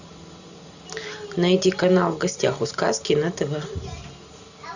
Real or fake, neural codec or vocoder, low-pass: real; none; 7.2 kHz